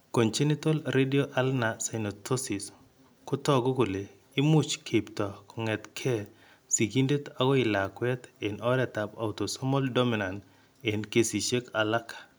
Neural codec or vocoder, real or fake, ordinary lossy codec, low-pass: none; real; none; none